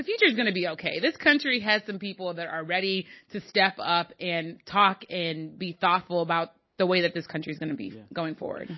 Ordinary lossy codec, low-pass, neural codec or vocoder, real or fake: MP3, 24 kbps; 7.2 kHz; none; real